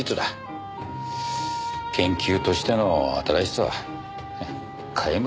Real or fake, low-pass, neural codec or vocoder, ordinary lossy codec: real; none; none; none